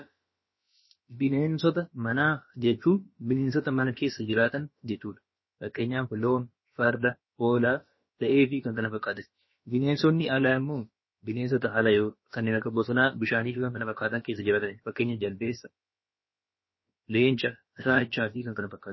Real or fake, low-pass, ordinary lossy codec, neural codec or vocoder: fake; 7.2 kHz; MP3, 24 kbps; codec, 16 kHz, about 1 kbps, DyCAST, with the encoder's durations